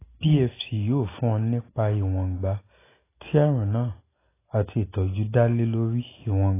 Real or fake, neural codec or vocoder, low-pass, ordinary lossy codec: real; none; 3.6 kHz; AAC, 16 kbps